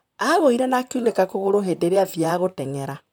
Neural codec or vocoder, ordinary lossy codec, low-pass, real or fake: vocoder, 44.1 kHz, 128 mel bands, Pupu-Vocoder; none; none; fake